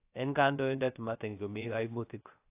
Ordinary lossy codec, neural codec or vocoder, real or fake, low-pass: AAC, 24 kbps; codec, 16 kHz, 0.3 kbps, FocalCodec; fake; 3.6 kHz